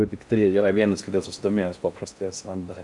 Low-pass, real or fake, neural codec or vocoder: 10.8 kHz; fake; codec, 16 kHz in and 24 kHz out, 0.6 kbps, FocalCodec, streaming, 4096 codes